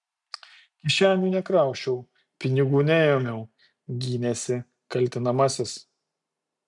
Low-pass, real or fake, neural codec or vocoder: 10.8 kHz; real; none